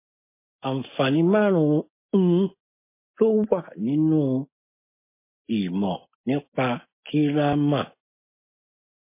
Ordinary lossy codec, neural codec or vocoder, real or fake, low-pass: MP3, 24 kbps; none; real; 3.6 kHz